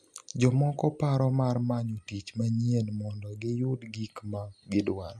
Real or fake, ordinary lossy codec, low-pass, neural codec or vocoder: real; none; none; none